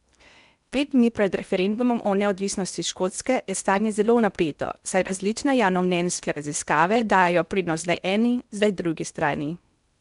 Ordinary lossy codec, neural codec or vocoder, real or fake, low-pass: none; codec, 16 kHz in and 24 kHz out, 0.8 kbps, FocalCodec, streaming, 65536 codes; fake; 10.8 kHz